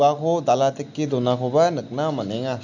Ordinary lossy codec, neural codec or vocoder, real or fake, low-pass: none; none; real; 7.2 kHz